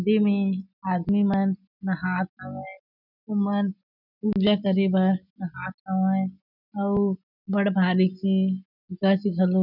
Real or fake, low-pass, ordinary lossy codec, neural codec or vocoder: real; 5.4 kHz; none; none